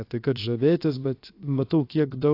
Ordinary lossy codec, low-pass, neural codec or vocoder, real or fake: AAC, 32 kbps; 5.4 kHz; codec, 16 kHz, 0.7 kbps, FocalCodec; fake